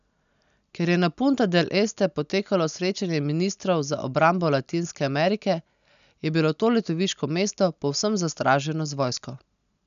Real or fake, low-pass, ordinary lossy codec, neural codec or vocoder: real; 7.2 kHz; none; none